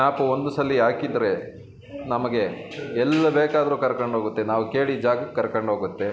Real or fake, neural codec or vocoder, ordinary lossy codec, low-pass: real; none; none; none